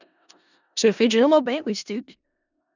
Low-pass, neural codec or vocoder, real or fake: 7.2 kHz; codec, 16 kHz in and 24 kHz out, 0.4 kbps, LongCat-Audio-Codec, four codebook decoder; fake